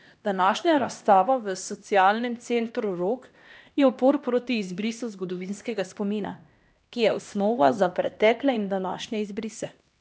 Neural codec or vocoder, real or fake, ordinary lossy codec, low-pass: codec, 16 kHz, 1 kbps, X-Codec, HuBERT features, trained on LibriSpeech; fake; none; none